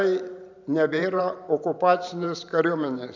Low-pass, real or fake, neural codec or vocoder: 7.2 kHz; fake; vocoder, 44.1 kHz, 128 mel bands every 512 samples, BigVGAN v2